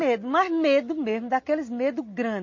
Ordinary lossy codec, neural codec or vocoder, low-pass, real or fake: MP3, 32 kbps; none; 7.2 kHz; real